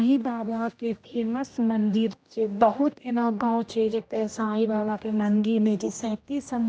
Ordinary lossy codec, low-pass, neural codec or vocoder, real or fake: none; none; codec, 16 kHz, 1 kbps, X-Codec, HuBERT features, trained on general audio; fake